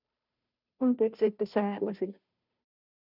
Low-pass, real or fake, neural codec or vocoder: 5.4 kHz; fake; codec, 16 kHz, 0.5 kbps, FunCodec, trained on Chinese and English, 25 frames a second